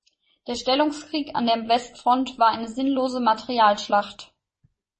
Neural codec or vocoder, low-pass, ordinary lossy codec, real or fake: vocoder, 24 kHz, 100 mel bands, Vocos; 10.8 kHz; MP3, 32 kbps; fake